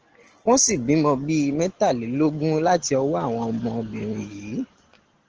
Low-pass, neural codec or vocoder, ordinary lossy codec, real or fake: 7.2 kHz; none; Opus, 16 kbps; real